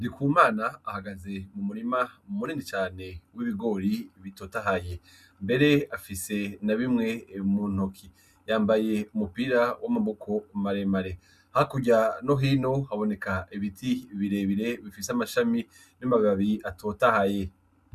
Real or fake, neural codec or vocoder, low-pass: real; none; 14.4 kHz